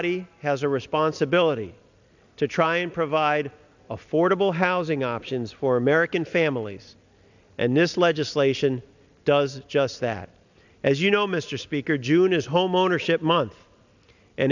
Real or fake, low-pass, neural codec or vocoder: real; 7.2 kHz; none